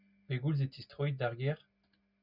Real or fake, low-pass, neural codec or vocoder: real; 5.4 kHz; none